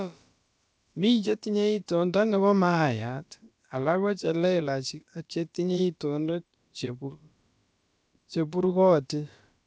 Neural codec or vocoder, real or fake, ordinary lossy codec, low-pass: codec, 16 kHz, about 1 kbps, DyCAST, with the encoder's durations; fake; none; none